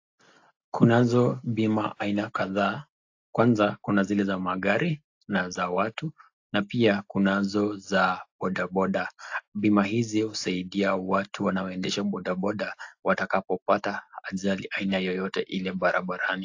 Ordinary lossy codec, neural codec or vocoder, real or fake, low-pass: AAC, 48 kbps; none; real; 7.2 kHz